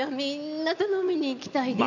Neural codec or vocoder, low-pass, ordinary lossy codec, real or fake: none; 7.2 kHz; none; real